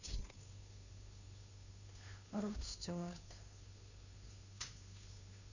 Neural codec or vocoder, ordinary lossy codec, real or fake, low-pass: codec, 16 kHz, 2 kbps, FunCodec, trained on Chinese and English, 25 frames a second; none; fake; 7.2 kHz